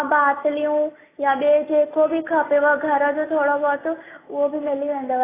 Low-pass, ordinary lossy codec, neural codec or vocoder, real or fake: 3.6 kHz; AAC, 16 kbps; none; real